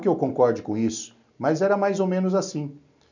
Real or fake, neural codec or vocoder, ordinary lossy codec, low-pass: real; none; none; 7.2 kHz